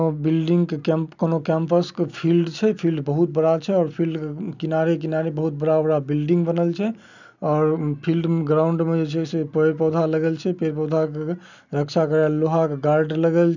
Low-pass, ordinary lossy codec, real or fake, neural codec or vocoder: 7.2 kHz; none; real; none